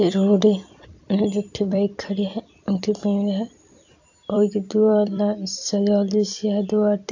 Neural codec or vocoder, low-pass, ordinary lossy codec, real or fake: vocoder, 44.1 kHz, 128 mel bands every 256 samples, BigVGAN v2; 7.2 kHz; AAC, 48 kbps; fake